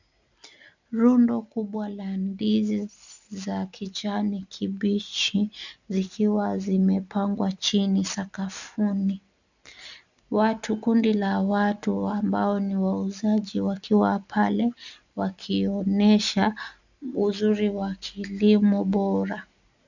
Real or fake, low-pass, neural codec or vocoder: real; 7.2 kHz; none